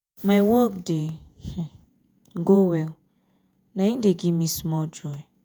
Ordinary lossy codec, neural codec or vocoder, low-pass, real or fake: none; vocoder, 48 kHz, 128 mel bands, Vocos; none; fake